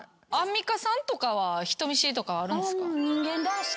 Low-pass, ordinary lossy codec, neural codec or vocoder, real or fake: none; none; none; real